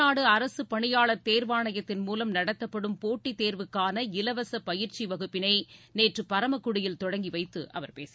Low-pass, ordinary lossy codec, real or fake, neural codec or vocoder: none; none; real; none